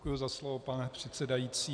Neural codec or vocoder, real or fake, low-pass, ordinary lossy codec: none; real; 9.9 kHz; MP3, 96 kbps